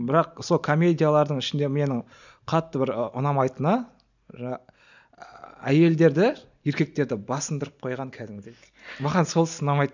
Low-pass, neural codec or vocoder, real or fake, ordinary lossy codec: 7.2 kHz; none; real; none